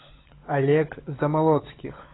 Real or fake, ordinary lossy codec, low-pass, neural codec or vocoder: fake; AAC, 16 kbps; 7.2 kHz; codec, 16 kHz, 16 kbps, FunCodec, trained on LibriTTS, 50 frames a second